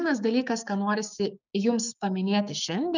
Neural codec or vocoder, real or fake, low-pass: codec, 16 kHz, 6 kbps, DAC; fake; 7.2 kHz